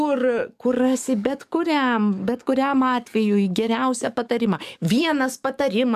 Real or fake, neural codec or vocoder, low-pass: fake; codec, 44.1 kHz, 7.8 kbps, DAC; 14.4 kHz